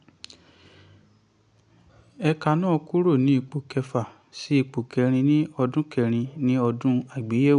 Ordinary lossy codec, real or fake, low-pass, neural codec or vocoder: none; real; 9.9 kHz; none